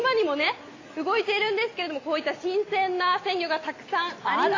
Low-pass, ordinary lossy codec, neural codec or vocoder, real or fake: 7.2 kHz; AAC, 32 kbps; none; real